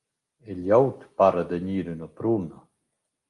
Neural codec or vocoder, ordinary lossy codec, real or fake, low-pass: none; Opus, 32 kbps; real; 10.8 kHz